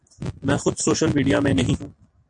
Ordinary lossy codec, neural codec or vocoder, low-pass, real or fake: AAC, 64 kbps; none; 9.9 kHz; real